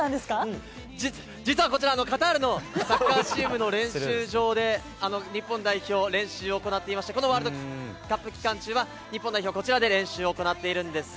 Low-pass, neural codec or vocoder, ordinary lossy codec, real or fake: none; none; none; real